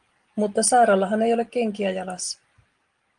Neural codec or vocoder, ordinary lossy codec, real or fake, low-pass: none; Opus, 24 kbps; real; 9.9 kHz